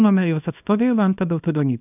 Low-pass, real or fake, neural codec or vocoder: 3.6 kHz; fake; codec, 24 kHz, 0.9 kbps, WavTokenizer, small release